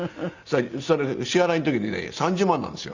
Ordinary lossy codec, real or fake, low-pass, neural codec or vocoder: Opus, 64 kbps; real; 7.2 kHz; none